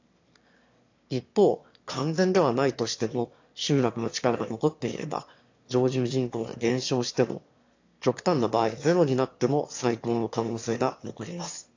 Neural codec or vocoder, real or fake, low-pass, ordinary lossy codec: autoencoder, 22.05 kHz, a latent of 192 numbers a frame, VITS, trained on one speaker; fake; 7.2 kHz; AAC, 48 kbps